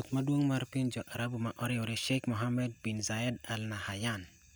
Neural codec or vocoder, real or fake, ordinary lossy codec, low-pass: none; real; none; none